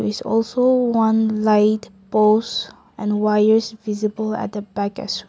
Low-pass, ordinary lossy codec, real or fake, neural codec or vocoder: none; none; real; none